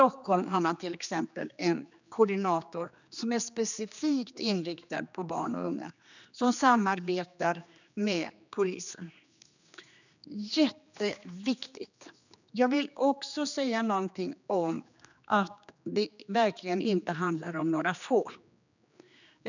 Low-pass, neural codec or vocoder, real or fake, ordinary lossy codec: 7.2 kHz; codec, 16 kHz, 2 kbps, X-Codec, HuBERT features, trained on general audio; fake; none